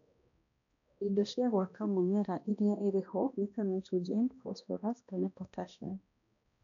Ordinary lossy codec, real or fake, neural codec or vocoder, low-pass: none; fake; codec, 16 kHz, 1 kbps, X-Codec, HuBERT features, trained on balanced general audio; 7.2 kHz